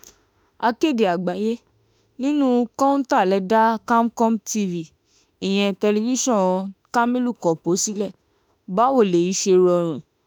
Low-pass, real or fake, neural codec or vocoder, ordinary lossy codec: none; fake; autoencoder, 48 kHz, 32 numbers a frame, DAC-VAE, trained on Japanese speech; none